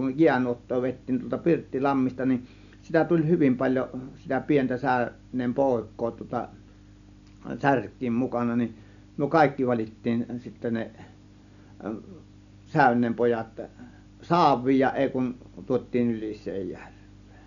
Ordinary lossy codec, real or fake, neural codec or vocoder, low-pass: none; real; none; 7.2 kHz